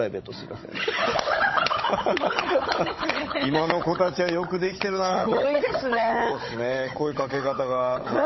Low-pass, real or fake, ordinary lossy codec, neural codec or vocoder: 7.2 kHz; fake; MP3, 24 kbps; codec, 16 kHz, 16 kbps, FunCodec, trained on Chinese and English, 50 frames a second